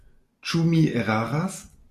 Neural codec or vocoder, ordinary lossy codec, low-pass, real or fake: none; MP3, 96 kbps; 14.4 kHz; real